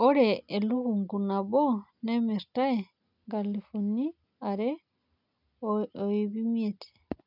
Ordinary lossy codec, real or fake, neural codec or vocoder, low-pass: none; real; none; 5.4 kHz